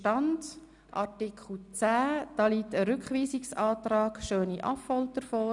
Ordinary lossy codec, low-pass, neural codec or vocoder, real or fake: none; 10.8 kHz; none; real